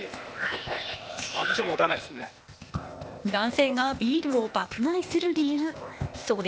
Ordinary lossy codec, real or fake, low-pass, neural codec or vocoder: none; fake; none; codec, 16 kHz, 0.8 kbps, ZipCodec